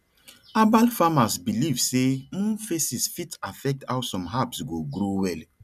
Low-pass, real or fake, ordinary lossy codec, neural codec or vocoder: 14.4 kHz; real; none; none